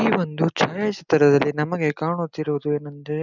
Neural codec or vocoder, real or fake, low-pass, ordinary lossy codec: none; real; 7.2 kHz; none